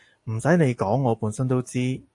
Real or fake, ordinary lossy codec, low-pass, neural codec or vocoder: real; AAC, 64 kbps; 10.8 kHz; none